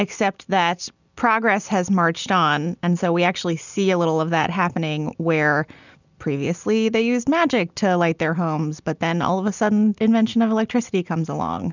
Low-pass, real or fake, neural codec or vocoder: 7.2 kHz; real; none